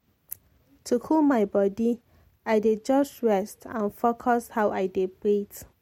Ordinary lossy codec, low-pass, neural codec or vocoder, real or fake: MP3, 64 kbps; 19.8 kHz; none; real